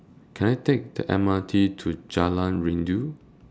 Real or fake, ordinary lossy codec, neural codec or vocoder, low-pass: real; none; none; none